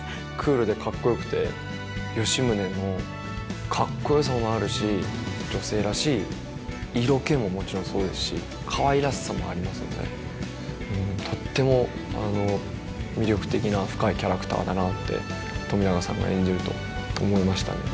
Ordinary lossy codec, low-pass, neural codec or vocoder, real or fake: none; none; none; real